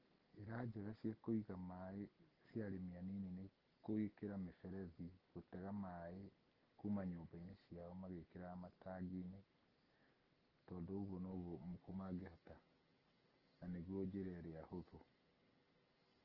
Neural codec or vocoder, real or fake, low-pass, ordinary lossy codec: none; real; 5.4 kHz; Opus, 16 kbps